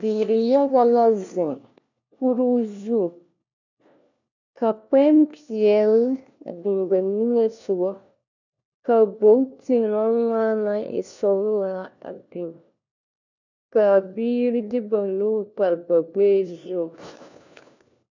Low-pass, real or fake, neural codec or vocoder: 7.2 kHz; fake; codec, 16 kHz, 1 kbps, FunCodec, trained on LibriTTS, 50 frames a second